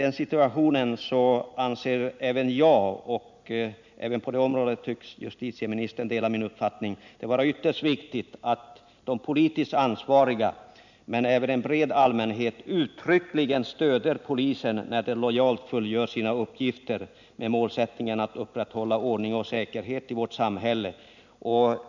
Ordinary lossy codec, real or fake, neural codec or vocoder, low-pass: none; real; none; 7.2 kHz